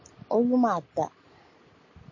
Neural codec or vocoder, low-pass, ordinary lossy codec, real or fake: none; 7.2 kHz; MP3, 32 kbps; real